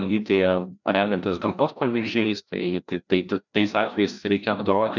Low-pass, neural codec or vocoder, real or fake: 7.2 kHz; codec, 16 kHz, 1 kbps, FreqCodec, larger model; fake